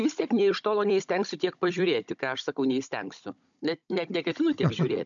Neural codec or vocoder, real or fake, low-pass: codec, 16 kHz, 16 kbps, FunCodec, trained on Chinese and English, 50 frames a second; fake; 7.2 kHz